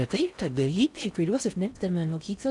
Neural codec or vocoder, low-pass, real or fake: codec, 16 kHz in and 24 kHz out, 0.6 kbps, FocalCodec, streaming, 4096 codes; 10.8 kHz; fake